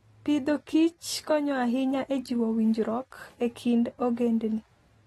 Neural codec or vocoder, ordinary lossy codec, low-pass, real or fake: none; AAC, 32 kbps; 19.8 kHz; real